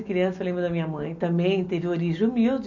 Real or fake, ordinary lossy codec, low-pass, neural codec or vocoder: real; none; 7.2 kHz; none